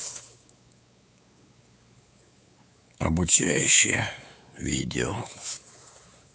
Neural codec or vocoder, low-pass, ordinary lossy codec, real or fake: codec, 16 kHz, 4 kbps, X-Codec, WavLM features, trained on Multilingual LibriSpeech; none; none; fake